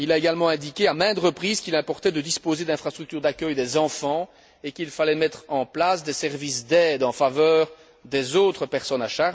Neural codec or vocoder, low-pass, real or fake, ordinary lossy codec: none; none; real; none